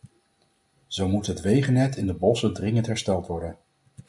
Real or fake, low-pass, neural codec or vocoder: real; 10.8 kHz; none